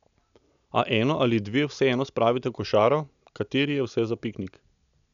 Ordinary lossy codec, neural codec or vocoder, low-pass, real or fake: none; none; 7.2 kHz; real